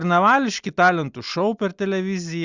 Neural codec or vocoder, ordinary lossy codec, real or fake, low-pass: none; Opus, 64 kbps; real; 7.2 kHz